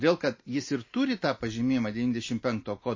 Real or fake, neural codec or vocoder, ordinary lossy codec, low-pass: real; none; MP3, 32 kbps; 7.2 kHz